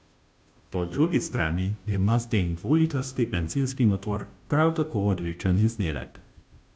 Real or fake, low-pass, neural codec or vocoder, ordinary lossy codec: fake; none; codec, 16 kHz, 0.5 kbps, FunCodec, trained on Chinese and English, 25 frames a second; none